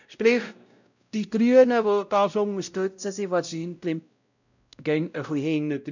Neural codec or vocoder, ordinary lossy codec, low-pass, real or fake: codec, 16 kHz, 0.5 kbps, X-Codec, WavLM features, trained on Multilingual LibriSpeech; none; 7.2 kHz; fake